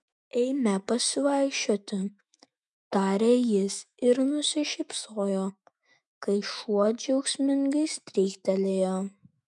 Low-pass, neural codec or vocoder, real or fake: 10.8 kHz; autoencoder, 48 kHz, 128 numbers a frame, DAC-VAE, trained on Japanese speech; fake